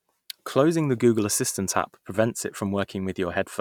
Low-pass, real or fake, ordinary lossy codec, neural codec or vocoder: 19.8 kHz; real; none; none